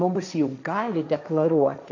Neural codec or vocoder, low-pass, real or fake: codec, 16 kHz, 1.1 kbps, Voila-Tokenizer; 7.2 kHz; fake